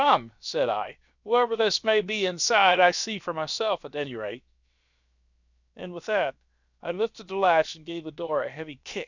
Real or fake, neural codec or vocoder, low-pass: fake; codec, 16 kHz, 0.7 kbps, FocalCodec; 7.2 kHz